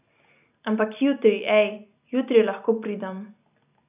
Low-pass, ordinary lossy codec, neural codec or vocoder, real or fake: 3.6 kHz; none; none; real